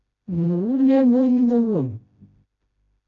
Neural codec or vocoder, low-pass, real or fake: codec, 16 kHz, 0.5 kbps, FreqCodec, smaller model; 7.2 kHz; fake